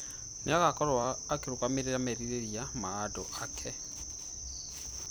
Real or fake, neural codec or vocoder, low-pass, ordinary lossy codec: fake; vocoder, 44.1 kHz, 128 mel bands every 256 samples, BigVGAN v2; none; none